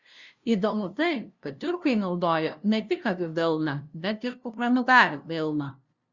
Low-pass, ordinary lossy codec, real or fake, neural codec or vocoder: 7.2 kHz; Opus, 64 kbps; fake; codec, 16 kHz, 0.5 kbps, FunCodec, trained on LibriTTS, 25 frames a second